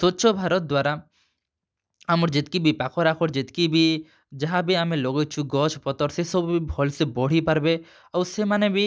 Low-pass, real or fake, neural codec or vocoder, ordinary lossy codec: none; real; none; none